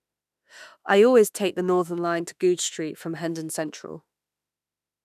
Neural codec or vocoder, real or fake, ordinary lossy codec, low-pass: autoencoder, 48 kHz, 32 numbers a frame, DAC-VAE, trained on Japanese speech; fake; none; 14.4 kHz